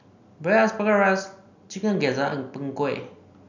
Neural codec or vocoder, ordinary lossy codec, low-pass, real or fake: none; none; 7.2 kHz; real